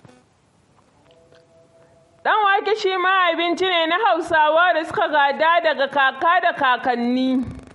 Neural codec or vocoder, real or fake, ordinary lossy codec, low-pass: none; real; MP3, 48 kbps; 19.8 kHz